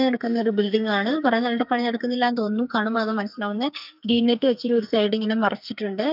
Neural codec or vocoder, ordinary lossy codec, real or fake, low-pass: codec, 44.1 kHz, 2.6 kbps, SNAC; none; fake; 5.4 kHz